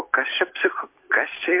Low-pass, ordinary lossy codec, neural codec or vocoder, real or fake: 3.6 kHz; MP3, 32 kbps; none; real